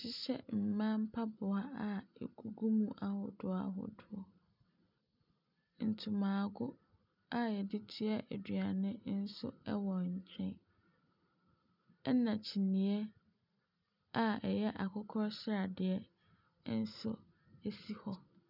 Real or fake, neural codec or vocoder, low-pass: real; none; 5.4 kHz